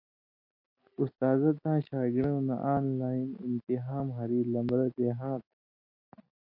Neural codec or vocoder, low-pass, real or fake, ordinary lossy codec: none; 5.4 kHz; real; MP3, 32 kbps